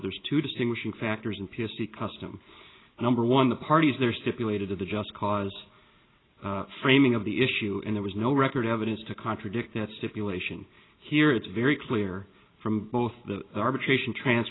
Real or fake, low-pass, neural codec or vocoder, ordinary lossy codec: real; 7.2 kHz; none; AAC, 16 kbps